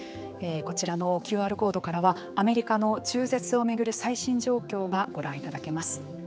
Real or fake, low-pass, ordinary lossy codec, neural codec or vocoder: fake; none; none; codec, 16 kHz, 4 kbps, X-Codec, HuBERT features, trained on general audio